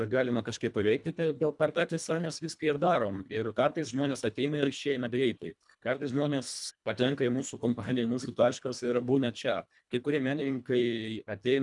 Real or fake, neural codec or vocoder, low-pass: fake; codec, 24 kHz, 1.5 kbps, HILCodec; 10.8 kHz